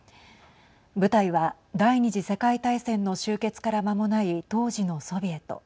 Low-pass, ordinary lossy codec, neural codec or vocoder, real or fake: none; none; none; real